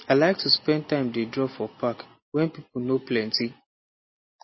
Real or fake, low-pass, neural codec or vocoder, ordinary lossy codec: real; 7.2 kHz; none; MP3, 24 kbps